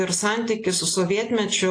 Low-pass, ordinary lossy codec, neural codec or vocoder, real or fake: 9.9 kHz; AAC, 48 kbps; none; real